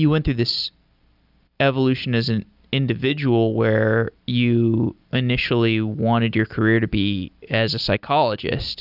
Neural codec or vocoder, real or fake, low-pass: none; real; 5.4 kHz